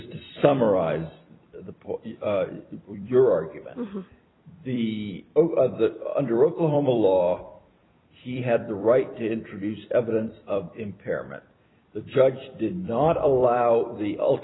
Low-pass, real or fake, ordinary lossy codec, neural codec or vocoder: 7.2 kHz; fake; AAC, 16 kbps; vocoder, 44.1 kHz, 128 mel bands every 256 samples, BigVGAN v2